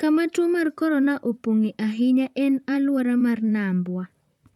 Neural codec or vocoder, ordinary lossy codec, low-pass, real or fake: vocoder, 44.1 kHz, 128 mel bands, Pupu-Vocoder; none; 19.8 kHz; fake